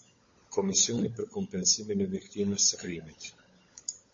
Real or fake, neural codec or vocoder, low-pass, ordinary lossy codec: fake; codec, 16 kHz, 16 kbps, FunCodec, trained on LibriTTS, 50 frames a second; 7.2 kHz; MP3, 32 kbps